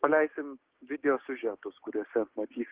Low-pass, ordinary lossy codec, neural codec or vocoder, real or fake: 3.6 kHz; Opus, 16 kbps; codec, 16 kHz, 6 kbps, DAC; fake